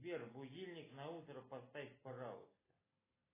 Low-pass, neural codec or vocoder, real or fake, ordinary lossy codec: 3.6 kHz; none; real; AAC, 16 kbps